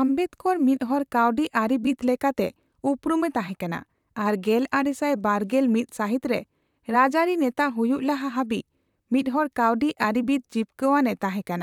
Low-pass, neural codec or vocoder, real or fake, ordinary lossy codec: 19.8 kHz; vocoder, 44.1 kHz, 128 mel bands, Pupu-Vocoder; fake; none